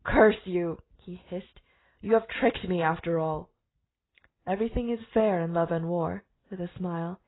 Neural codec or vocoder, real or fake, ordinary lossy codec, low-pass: none; real; AAC, 16 kbps; 7.2 kHz